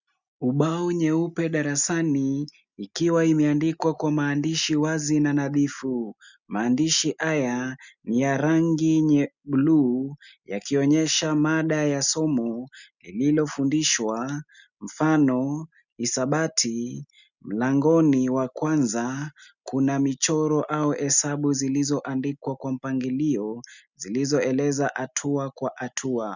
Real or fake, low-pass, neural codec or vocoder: real; 7.2 kHz; none